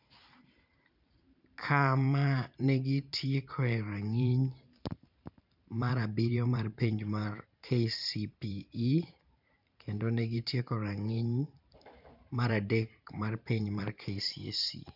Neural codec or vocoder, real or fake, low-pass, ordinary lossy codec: vocoder, 44.1 kHz, 128 mel bands every 512 samples, BigVGAN v2; fake; 5.4 kHz; none